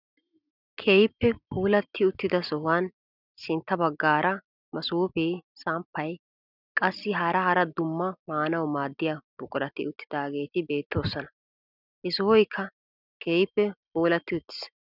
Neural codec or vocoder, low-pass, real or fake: none; 5.4 kHz; real